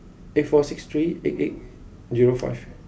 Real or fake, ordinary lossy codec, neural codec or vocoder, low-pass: real; none; none; none